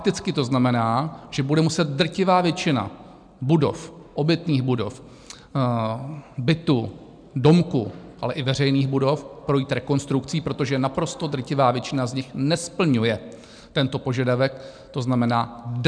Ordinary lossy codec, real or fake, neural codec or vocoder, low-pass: MP3, 96 kbps; real; none; 9.9 kHz